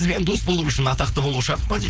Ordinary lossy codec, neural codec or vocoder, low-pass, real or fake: none; codec, 16 kHz, 4.8 kbps, FACodec; none; fake